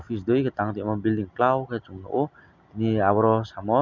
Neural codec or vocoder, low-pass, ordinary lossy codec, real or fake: none; 7.2 kHz; none; real